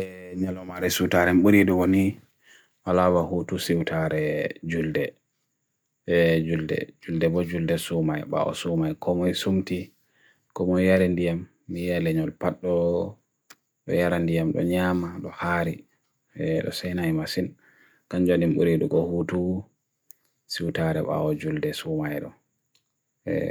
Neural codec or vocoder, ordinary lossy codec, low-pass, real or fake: none; none; none; real